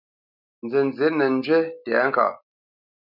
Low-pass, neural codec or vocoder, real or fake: 5.4 kHz; none; real